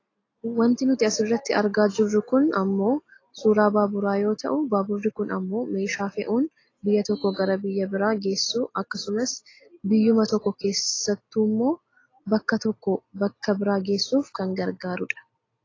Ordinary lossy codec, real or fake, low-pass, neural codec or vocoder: AAC, 32 kbps; real; 7.2 kHz; none